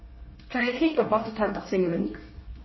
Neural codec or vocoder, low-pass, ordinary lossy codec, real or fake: codec, 24 kHz, 1 kbps, SNAC; 7.2 kHz; MP3, 24 kbps; fake